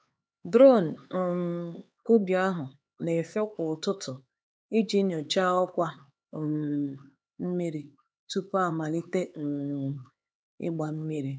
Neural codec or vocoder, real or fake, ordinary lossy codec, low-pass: codec, 16 kHz, 4 kbps, X-Codec, HuBERT features, trained on LibriSpeech; fake; none; none